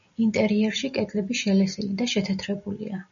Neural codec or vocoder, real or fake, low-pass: none; real; 7.2 kHz